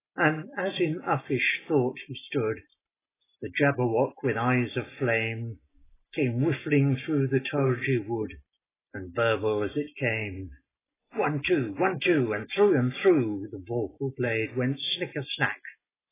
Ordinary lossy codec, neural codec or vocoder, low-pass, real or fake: AAC, 16 kbps; none; 3.6 kHz; real